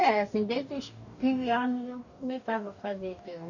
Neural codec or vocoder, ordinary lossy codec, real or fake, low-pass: codec, 44.1 kHz, 2.6 kbps, DAC; none; fake; 7.2 kHz